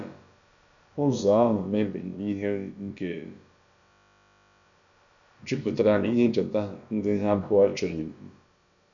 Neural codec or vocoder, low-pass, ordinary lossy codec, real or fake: codec, 16 kHz, about 1 kbps, DyCAST, with the encoder's durations; 7.2 kHz; none; fake